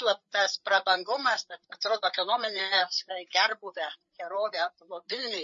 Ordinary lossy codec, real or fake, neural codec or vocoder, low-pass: MP3, 32 kbps; fake; codec, 16 kHz, 8 kbps, FreqCodec, larger model; 7.2 kHz